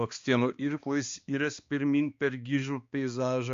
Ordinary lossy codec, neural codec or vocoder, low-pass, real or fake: MP3, 48 kbps; codec, 16 kHz, 2 kbps, X-Codec, HuBERT features, trained on balanced general audio; 7.2 kHz; fake